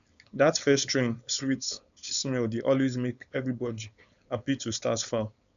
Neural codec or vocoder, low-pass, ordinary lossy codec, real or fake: codec, 16 kHz, 4.8 kbps, FACodec; 7.2 kHz; none; fake